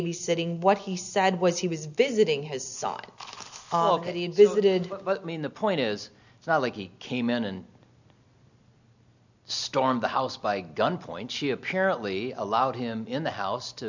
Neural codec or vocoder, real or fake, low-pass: none; real; 7.2 kHz